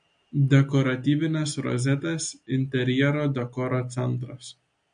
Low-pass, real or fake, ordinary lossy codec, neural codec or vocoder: 9.9 kHz; real; MP3, 48 kbps; none